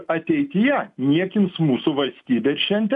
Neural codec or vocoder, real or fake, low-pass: none; real; 10.8 kHz